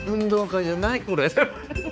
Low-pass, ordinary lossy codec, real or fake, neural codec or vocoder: none; none; fake; codec, 16 kHz, 4 kbps, X-Codec, HuBERT features, trained on balanced general audio